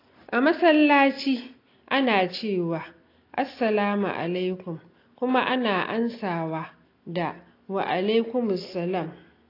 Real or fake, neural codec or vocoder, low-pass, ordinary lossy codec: real; none; 5.4 kHz; AAC, 32 kbps